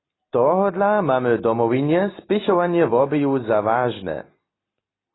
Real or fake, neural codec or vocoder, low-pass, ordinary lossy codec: real; none; 7.2 kHz; AAC, 16 kbps